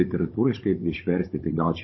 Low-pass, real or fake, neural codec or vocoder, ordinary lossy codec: 7.2 kHz; fake; codec, 16 kHz, 16 kbps, FunCodec, trained on Chinese and English, 50 frames a second; MP3, 24 kbps